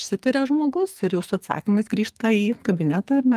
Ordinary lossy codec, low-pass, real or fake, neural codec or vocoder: Opus, 32 kbps; 14.4 kHz; fake; codec, 44.1 kHz, 2.6 kbps, SNAC